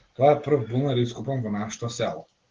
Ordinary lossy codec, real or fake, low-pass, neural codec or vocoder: Opus, 16 kbps; real; 7.2 kHz; none